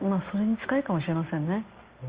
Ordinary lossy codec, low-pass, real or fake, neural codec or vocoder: Opus, 32 kbps; 3.6 kHz; real; none